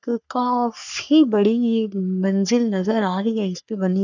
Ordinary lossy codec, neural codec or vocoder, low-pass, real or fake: none; codec, 44.1 kHz, 3.4 kbps, Pupu-Codec; 7.2 kHz; fake